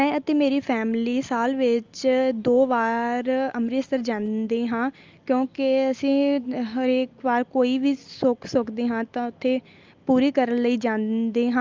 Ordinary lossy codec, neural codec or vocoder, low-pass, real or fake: Opus, 32 kbps; none; 7.2 kHz; real